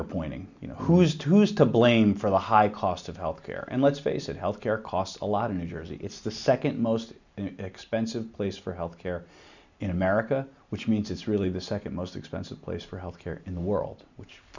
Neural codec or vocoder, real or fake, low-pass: none; real; 7.2 kHz